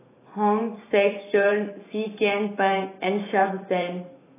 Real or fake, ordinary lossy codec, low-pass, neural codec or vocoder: fake; AAC, 16 kbps; 3.6 kHz; vocoder, 44.1 kHz, 128 mel bands every 512 samples, BigVGAN v2